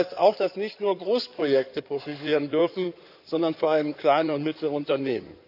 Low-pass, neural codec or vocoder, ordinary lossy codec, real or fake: 5.4 kHz; codec, 16 kHz in and 24 kHz out, 2.2 kbps, FireRedTTS-2 codec; none; fake